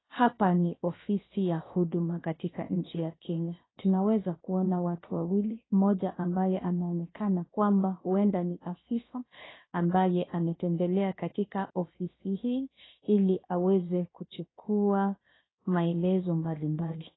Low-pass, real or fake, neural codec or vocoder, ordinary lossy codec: 7.2 kHz; fake; codec, 16 kHz, 0.7 kbps, FocalCodec; AAC, 16 kbps